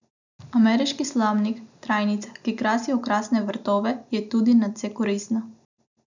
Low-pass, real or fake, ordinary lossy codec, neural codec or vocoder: 7.2 kHz; real; none; none